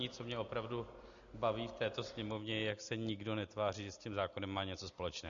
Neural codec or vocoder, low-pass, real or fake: none; 7.2 kHz; real